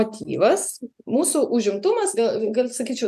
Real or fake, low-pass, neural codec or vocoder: real; 14.4 kHz; none